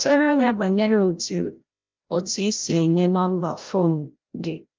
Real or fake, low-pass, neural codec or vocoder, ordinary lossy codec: fake; 7.2 kHz; codec, 16 kHz, 0.5 kbps, FreqCodec, larger model; Opus, 24 kbps